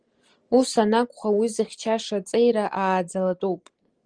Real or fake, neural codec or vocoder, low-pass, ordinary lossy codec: real; none; 9.9 kHz; Opus, 24 kbps